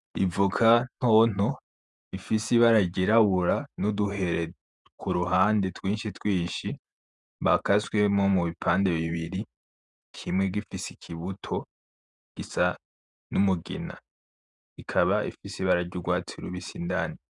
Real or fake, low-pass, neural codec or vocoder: fake; 10.8 kHz; vocoder, 48 kHz, 128 mel bands, Vocos